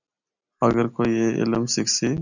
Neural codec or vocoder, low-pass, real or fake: none; 7.2 kHz; real